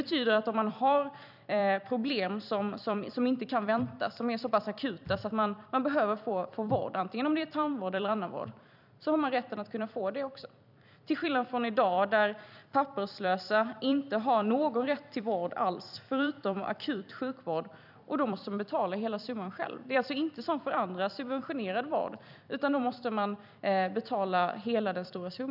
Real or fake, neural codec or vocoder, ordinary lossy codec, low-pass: fake; vocoder, 44.1 kHz, 128 mel bands every 256 samples, BigVGAN v2; none; 5.4 kHz